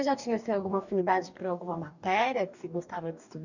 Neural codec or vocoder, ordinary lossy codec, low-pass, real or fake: codec, 44.1 kHz, 2.6 kbps, DAC; none; 7.2 kHz; fake